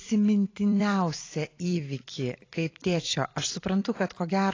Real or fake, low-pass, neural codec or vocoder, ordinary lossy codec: fake; 7.2 kHz; vocoder, 44.1 kHz, 128 mel bands every 256 samples, BigVGAN v2; AAC, 32 kbps